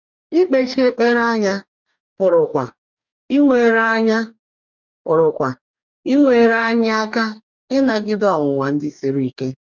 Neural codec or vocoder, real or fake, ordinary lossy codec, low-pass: codec, 44.1 kHz, 2.6 kbps, DAC; fake; none; 7.2 kHz